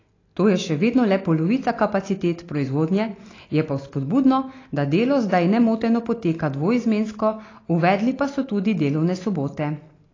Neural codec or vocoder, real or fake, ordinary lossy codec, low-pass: none; real; AAC, 32 kbps; 7.2 kHz